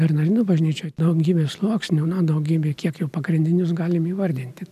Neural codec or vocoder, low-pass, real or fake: none; 14.4 kHz; real